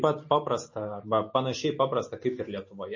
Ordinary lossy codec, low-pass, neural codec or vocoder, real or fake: MP3, 32 kbps; 7.2 kHz; none; real